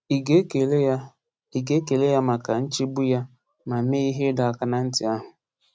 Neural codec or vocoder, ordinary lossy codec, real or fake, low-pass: none; none; real; none